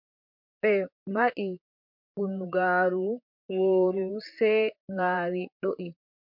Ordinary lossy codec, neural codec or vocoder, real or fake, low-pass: MP3, 48 kbps; vocoder, 44.1 kHz, 128 mel bands, Pupu-Vocoder; fake; 5.4 kHz